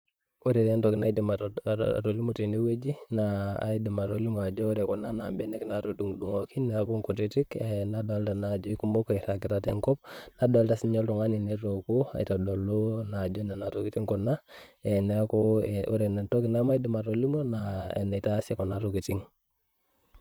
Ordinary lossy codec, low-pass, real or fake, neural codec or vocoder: none; none; fake; vocoder, 44.1 kHz, 128 mel bands, Pupu-Vocoder